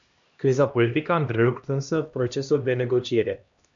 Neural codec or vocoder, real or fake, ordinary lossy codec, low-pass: codec, 16 kHz, 1 kbps, X-Codec, HuBERT features, trained on LibriSpeech; fake; MP3, 64 kbps; 7.2 kHz